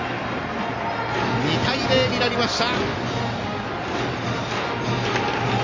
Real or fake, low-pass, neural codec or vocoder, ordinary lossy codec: real; 7.2 kHz; none; AAC, 32 kbps